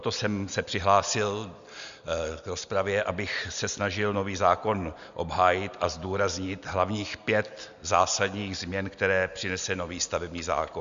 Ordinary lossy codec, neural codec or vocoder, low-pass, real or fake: Opus, 64 kbps; none; 7.2 kHz; real